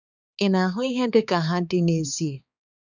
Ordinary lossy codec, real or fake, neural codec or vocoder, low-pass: none; fake; codec, 16 kHz, 4 kbps, X-Codec, HuBERT features, trained on balanced general audio; 7.2 kHz